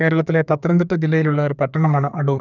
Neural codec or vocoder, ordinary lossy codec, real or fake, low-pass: codec, 32 kHz, 1.9 kbps, SNAC; none; fake; 7.2 kHz